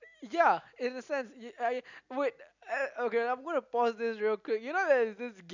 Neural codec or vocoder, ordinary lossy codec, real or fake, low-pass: none; none; real; 7.2 kHz